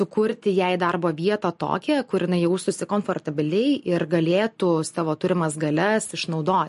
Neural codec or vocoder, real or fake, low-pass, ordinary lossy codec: none; real; 14.4 kHz; MP3, 48 kbps